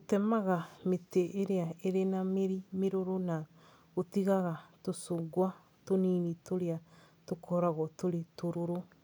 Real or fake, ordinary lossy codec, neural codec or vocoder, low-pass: real; none; none; none